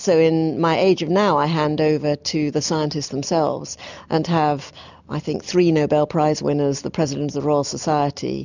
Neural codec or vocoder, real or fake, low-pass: none; real; 7.2 kHz